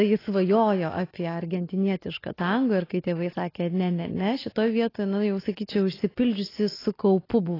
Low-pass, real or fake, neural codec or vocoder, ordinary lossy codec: 5.4 kHz; real; none; AAC, 24 kbps